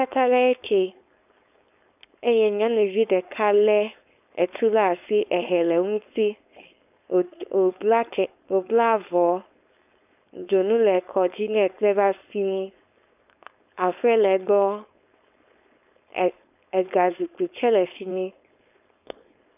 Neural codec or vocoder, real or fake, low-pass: codec, 16 kHz, 4.8 kbps, FACodec; fake; 3.6 kHz